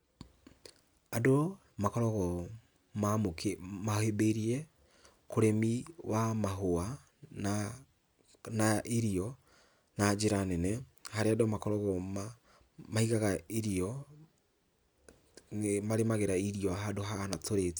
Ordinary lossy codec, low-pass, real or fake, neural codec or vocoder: none; none; real; none